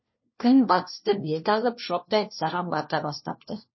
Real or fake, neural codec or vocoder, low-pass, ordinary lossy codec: fake; codec, 16 kHz, 1 kbps, FunCodec, trained on LibriTTS, 50 frames a second; 7.2 kHz; MP3, 24 kbps